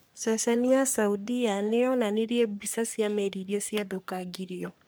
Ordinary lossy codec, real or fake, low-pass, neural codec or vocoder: none; fake; none; codec, 44.1 kHz, 3.4 kbps, Pupu-Codec